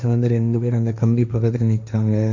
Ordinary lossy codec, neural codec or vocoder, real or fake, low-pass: none; codec, 16 kHz, 1.1 kbps, Voila-Tokenizer; fake; 7.2 kHz